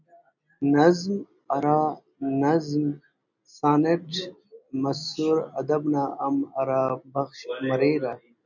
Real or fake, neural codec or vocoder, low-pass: real; none; 7.2 kHz